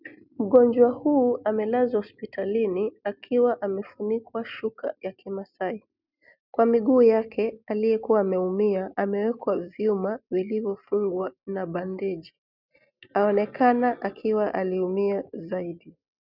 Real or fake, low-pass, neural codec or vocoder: real; 5.4 kHz; none